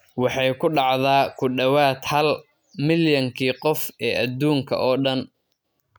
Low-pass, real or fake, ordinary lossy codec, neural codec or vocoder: none; real; none; none